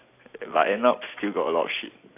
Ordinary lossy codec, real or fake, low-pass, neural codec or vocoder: AAC, 24 kbps; real; 3.6 kHz; none